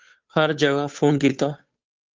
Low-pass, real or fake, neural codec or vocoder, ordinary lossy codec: 7.2 kHz; fake; codec, 16 kHz, 2 kbps, FunCodec, trained on Chinese and English, 25 frames a second; Opus, 32 kbps